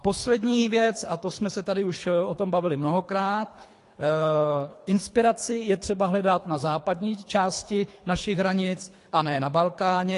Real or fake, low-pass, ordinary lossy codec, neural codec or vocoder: fake; 10.8 kHz; AAC, 48 kbps; codec, 24 kHz, 3 kbps, HILCodec